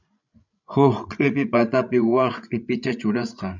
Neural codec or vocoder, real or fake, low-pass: codec, 16 kHz, 16 kbps, FreqCodec, larger model; fake; 7.2 kHz